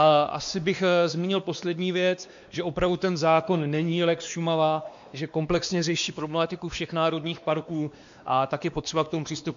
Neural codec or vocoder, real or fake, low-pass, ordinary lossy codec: codec, 16 kHz, 2 kbps, X-Codec, WavLM features, trained on Multilingual LibriSpeech; fake; 7.2 kHz; MP3, 64 kbps